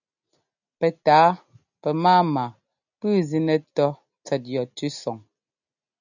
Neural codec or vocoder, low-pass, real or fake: none; 7.2 kHz; real